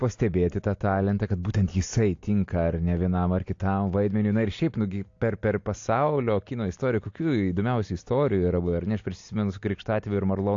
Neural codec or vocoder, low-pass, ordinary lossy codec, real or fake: none; 7.2 kHz; AAC, 48 kbps; real